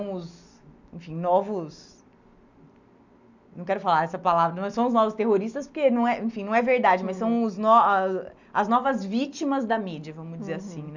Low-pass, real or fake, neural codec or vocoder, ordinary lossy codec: 7.2 kHz; real; none; none